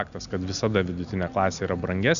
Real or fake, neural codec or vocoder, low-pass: real; none; 7.2 kHz